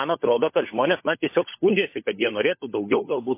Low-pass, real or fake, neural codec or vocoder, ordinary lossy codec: 3.6 kHz; fake; codec, 16 kHz, 16 kbps, FunCodec, trained on LibriTTS, 50 frames a second; MP3, 24 kbps